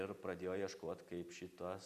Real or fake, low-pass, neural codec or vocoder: real; 14.4 kHz; none